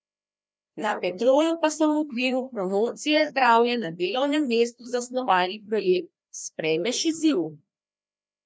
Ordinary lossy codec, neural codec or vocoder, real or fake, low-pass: none; codec, 16 kHz, 1 kbps, FreqCodec, larger model; fake; none